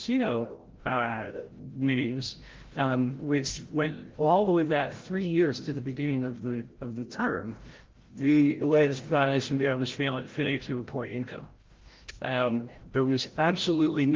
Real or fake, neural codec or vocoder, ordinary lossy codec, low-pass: fake; codec, 16 kHz, 0.5 kbps, FreqCodec, larger model; Opus, 16 kbps; 7.2 kHz